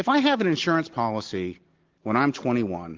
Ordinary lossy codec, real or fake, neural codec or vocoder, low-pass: Opus, 16 kbps; real; none; 7.2 kHz